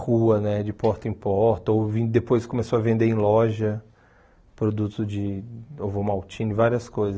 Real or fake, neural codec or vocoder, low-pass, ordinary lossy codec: real; none; none; none